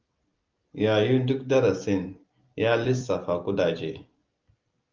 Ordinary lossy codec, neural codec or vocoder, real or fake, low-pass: Opus, 24 kbps; none; real; 7.2 kHz